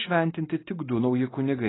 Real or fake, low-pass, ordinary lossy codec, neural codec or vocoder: real; 7.2 kHz; AAC, 16 kbps; none